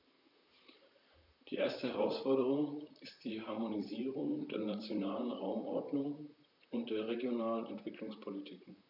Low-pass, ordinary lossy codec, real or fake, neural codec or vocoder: 5.4 kHz; none; fake; vocoder, 44.1 kHz, 128 mel bands, Pupu-Vocoder